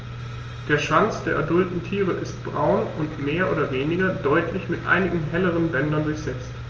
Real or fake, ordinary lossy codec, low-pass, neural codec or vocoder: real; Opus, 24 kbps; 7.2 kHz; none